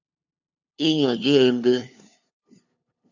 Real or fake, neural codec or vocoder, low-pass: fake; codec, 16 kHz, 2 kbps, FunCodec, trained on LibriTTS, 25 frames a second; 7.2 kHz